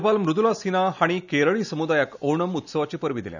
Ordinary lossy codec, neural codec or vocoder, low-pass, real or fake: none; none; 7.2 kHz; real